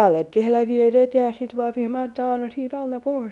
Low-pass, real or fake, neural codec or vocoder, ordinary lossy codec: 10.8 kHz; fake; codec, 24 kHz, 0.9 kbps, WavTokenizer, medium speech release version 2; none